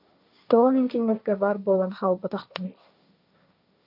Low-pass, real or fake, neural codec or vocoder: 5.4 kHz; fake; codec, 16 kHz, 1.1 kbps, Voila-Tokenizer